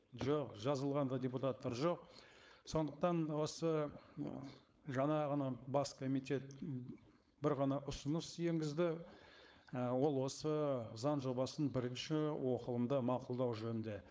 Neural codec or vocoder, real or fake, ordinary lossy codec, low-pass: codec, 16 kHz, 4.8 kbps, FACodec; fake; none; none